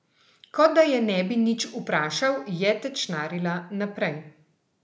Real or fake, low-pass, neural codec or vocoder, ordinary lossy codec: real; none; none; none